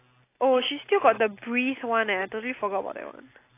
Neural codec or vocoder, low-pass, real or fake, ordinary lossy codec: none; 3.6 kHz; real; AAC, 24 kbps